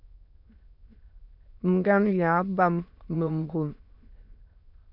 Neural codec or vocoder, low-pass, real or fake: autoencoder, 22.05 kHz, a latent of 192 numbers a frame, VITS, trained on many speakers; 5.4 kHz; fake